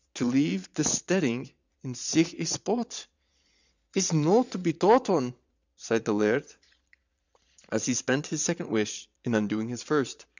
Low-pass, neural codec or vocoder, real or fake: 7.2 kHz; vocoder, 22.05 kHz, 80 mel bands, Vocos; fake